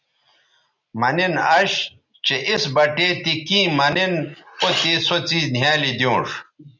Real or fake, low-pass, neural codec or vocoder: real; 7.2 kHz; none